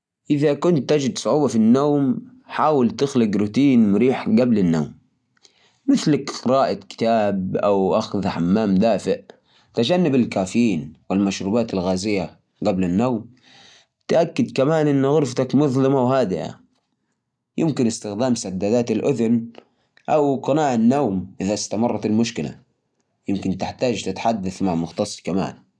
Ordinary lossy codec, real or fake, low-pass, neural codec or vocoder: none; real; none; none